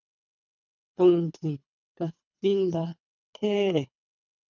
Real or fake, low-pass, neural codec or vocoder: fake; 7.2 kHz; codec, 24 kHz, 3 kbps, HILCodec